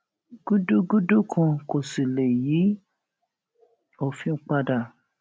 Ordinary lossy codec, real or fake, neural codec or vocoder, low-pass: none; real; none; none